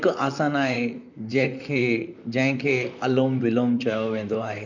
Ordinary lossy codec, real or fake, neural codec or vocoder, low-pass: none; fake; vocoder, 44.1 kHz, 128 mel bands, Pupu-Vocoder; 7.2 kHz